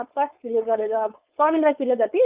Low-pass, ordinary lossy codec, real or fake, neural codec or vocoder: 3.6 kHz; Opus, 24 kbps; fake; codec, 16 kHz, 4.8 kbps, FACodec